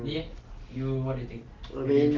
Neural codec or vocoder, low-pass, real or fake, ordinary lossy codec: none; 7.2 kHz; real; Opus, 16 kbps